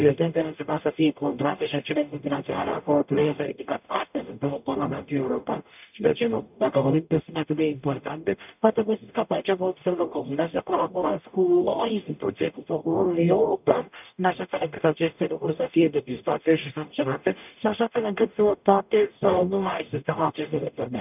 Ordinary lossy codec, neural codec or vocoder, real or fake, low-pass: none; codec, 44.1 kHz, 0.9 kbps, DAC; fake; 3.6 kHz